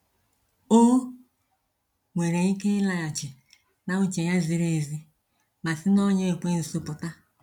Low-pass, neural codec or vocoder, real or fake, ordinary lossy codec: 19.8 kHz; none; real; none